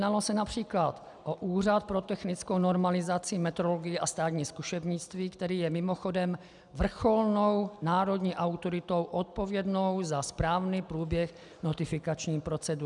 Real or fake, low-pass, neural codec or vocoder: real; 10.8 kHz; none